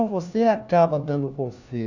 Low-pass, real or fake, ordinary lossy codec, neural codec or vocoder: 7.2 kHz; fake; none; codec, 16 kHz, 1 kbps, FunCodec, trained on LibriTTS, 50 frames a second